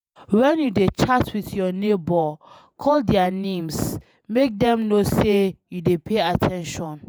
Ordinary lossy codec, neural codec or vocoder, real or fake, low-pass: none; vocoder, 48 kHz, 128 mel bands, Vocos; fake; none